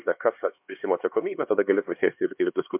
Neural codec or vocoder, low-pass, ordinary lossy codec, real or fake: codec, 16 kHz, 2 kbps, X-Codec, HuBERT features, trained on LibriSpeech; 3.6 kHz; MP3, 32 kbps; fake